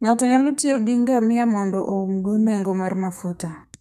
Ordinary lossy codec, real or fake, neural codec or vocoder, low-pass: none; fake; codec, 32 kHz, 1.9 kbps, SNAC; 14.4 kHz